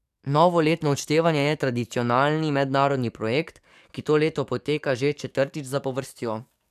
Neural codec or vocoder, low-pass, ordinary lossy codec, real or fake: codec, 44.1 kHz, 7.8 kbps, DAC; 14.4 kHz; none; fake